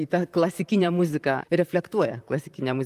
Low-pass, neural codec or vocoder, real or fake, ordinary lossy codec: 14.4 kHz; vocoder, 44.1 kHz, 128 mel bands, Pupu-Vocoder; fake; Opus, 32 kbps